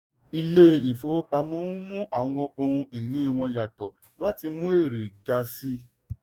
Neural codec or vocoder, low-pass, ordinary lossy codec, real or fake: codec, 44.1 kHz, 2.6 kbps, DAC; 19.8 kHz; none; fake